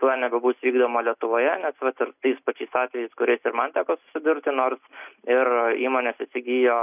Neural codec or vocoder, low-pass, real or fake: none; 3.6 kHz; real